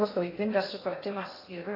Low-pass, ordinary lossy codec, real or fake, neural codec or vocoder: 5.4 kHz; AAC, 24 kbps; fake; codec, 16 kHz in and 24 kHz out, 0.6 kbps, FocalCodec, streaming, 2048 codes